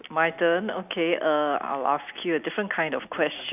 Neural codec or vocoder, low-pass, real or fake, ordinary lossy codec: none; 3.6 kHz; real; none